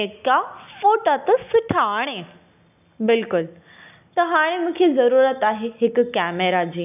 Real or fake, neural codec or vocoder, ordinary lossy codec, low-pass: real; none; none; 3.6 kHz